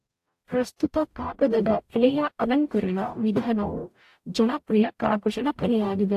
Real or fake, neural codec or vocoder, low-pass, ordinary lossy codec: fake; codec, 44.1 kHz, 0.9 kbps, DAC; 14.4 kHz; AAC, 64 kbps